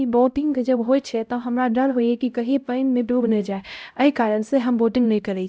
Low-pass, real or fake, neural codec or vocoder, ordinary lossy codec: none; fake; codec, 16 kHz, 0.5 kbps, X-Codec, HuBERT features, trained on LibriSpeech; none